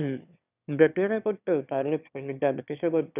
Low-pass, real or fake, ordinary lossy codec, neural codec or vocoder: 3.6 kHz; fake; none; autoencoder, 22.05 kHz, a latent of 192 numbers a frame, VITS, trained on one speaker